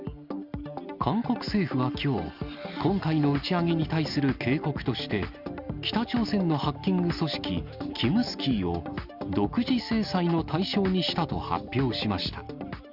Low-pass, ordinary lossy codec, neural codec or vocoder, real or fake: 5.4 kHz; Opus, 64 kbps; none; real